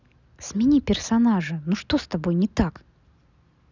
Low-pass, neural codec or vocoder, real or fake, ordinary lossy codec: 7.2 kHz; none; real; none